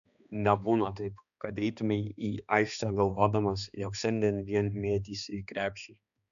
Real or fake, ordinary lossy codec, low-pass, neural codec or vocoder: fake; AAC, 96 kbps; 7.2 kHz; codec, 16 kHz, 2 kbps, X-Codec, HuBERT features, trained on balanced general audio